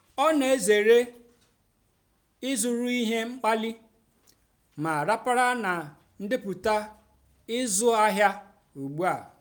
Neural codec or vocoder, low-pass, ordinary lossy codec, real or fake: none; none; none; real